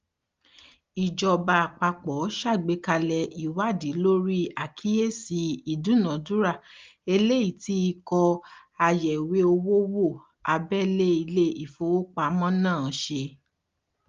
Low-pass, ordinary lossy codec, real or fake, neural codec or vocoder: 7.2 kHz; Opus, 32 kbps; real; none